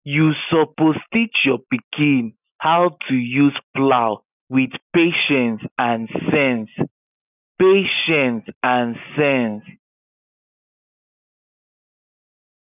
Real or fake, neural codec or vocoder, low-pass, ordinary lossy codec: real; none; 3.6 kHz; none